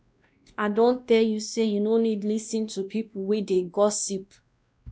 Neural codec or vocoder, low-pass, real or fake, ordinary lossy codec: codec, 16 kHz, 1 kbps, X-Codec, WavLM features, trained on Multilingual LibriSpeech; none; fake; none